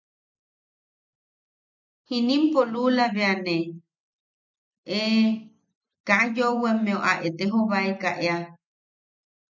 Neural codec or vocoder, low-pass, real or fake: none; 7.2 kHz; real